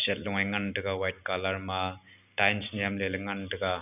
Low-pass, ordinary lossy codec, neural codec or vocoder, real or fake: 3.6 kHz; none; none; real